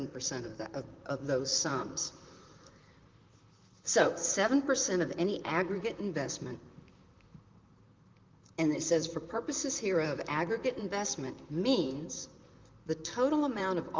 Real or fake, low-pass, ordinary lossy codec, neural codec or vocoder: fake; 7.2 kHz; Opus, 32 kbps; vocoder, 44.1 kHz, 128 mel bands, Pupu-Vocoder